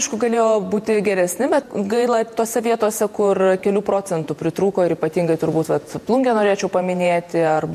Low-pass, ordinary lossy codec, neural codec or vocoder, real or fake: 14.4 kHz; MP3, 64 kbps; vocoder, 48 kHz, 128 mel bands, Vocos; fake